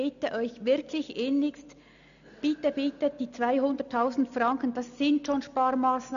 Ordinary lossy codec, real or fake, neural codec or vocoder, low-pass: none; real; none; 7.2 kHz